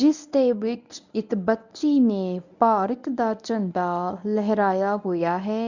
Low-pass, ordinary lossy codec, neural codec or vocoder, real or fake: 7.2 kHz; none; codec, 24 kHz, 0.9 kbps, WavTokenizer, medium speech release version 1; fake